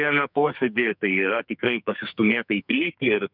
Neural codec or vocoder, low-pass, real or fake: codec, 44.1 kHz, 2.6 kbps, SNAC; 5.4 kHz; fake